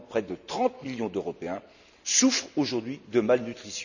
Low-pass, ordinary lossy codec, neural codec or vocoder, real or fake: 7.2 kHz; none; none; real